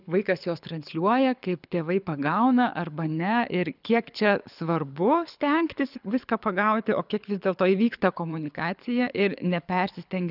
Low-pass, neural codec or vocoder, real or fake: 5.4 kHz; codec, 24 kHz, 6 kbps, HILCodec; fake